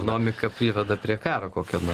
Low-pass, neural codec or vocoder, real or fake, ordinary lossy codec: 14.4 kHz; none; real; Opus, 16 kbps